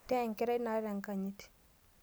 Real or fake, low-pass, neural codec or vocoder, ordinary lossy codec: real; none; none; none